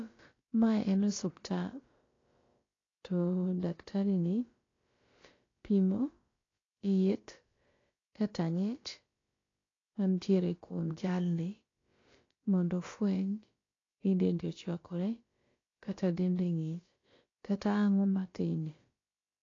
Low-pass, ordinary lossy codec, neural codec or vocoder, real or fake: 7.2 kHz; AAC, 32 kbps; codec, 16 kHz, about 1 kbps, DyCAST, with the encoder's durations; fake